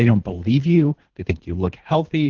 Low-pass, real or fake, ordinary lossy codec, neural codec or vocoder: 7.2 kHz; fake; Opus, 16 kbps; codec, 24 kHz, 3 kbps, HILCodec